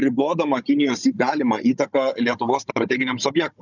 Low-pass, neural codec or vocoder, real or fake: 7.2 kHz; codec, 16 kHz, 16 kbps, FunCodec, trained on Chinese and English, 50 frames a second; fake